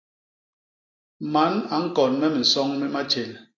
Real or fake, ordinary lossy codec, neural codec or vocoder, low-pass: real; AAC, 32 kbps; none; 7.2 kHz